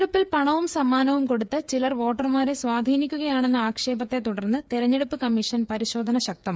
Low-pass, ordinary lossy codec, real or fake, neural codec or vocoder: none; none; fake; codec, 16 kHz, 8 kbps, FreqCodec, smaller model